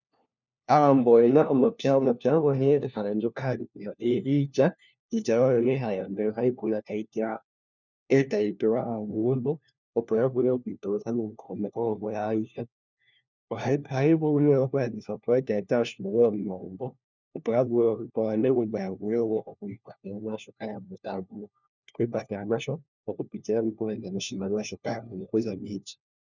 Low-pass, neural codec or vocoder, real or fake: 7.2 kHz; codec, 16 kHz, 1 kbps, FunCodec, trained on LibriTTS, 50 frames a second; fake